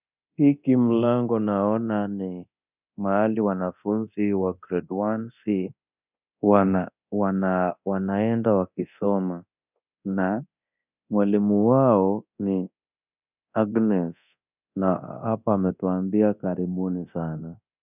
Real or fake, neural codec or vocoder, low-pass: fake; codec, 24 kHz, 0.9 kbps, DualCodec; 3.6 kHz